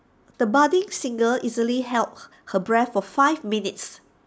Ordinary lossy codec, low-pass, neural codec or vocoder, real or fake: none; none; none; real